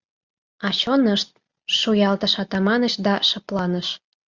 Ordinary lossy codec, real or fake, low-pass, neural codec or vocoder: Opus, 64 kbps; real; 7.2 kHz; none